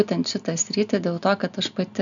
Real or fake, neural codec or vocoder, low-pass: real; none; 7.2 kHz